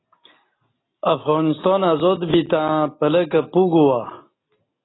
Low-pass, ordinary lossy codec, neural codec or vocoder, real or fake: 7.2 kHz; AAC, 16 kbps; none; real